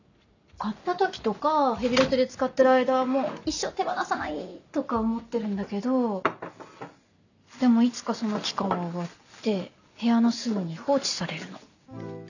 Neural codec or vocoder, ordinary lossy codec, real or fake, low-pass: none; none; real; 7.2 kHz